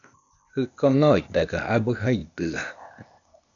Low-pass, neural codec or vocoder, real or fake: 7.2 kHz; codec, 16 kHz, 0.8 kbps, ZipCodec; fake